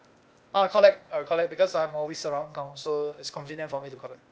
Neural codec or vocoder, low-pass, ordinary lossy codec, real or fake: codec, 16 kHz, 0.8 kbps, ZipCodec; none; none; fake